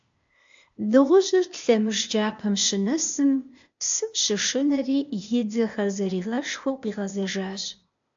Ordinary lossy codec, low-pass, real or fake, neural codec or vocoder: AAC, 64 kbps; 7.2 kHz; fake; codec, 16 kHz, 0.8 kbps, ZipCodec